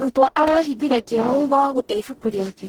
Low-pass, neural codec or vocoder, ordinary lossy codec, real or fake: 19.8 kHz; codec, 44.1 kHz, 0.9 kbps, DAC; Opus, 16 kbps; fake